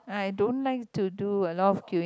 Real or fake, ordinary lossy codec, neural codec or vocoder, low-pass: real; none; none; none